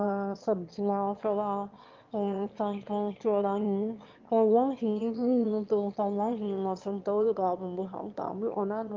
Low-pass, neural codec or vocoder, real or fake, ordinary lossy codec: 7.2 kHz; autoencoder, 22.05 kHz, a latent of 192 numbers a frame, VITS, trained on one speaker; fake; Opus, 24 kbps